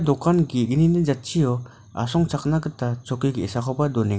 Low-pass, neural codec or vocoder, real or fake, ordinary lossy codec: none; none; real; none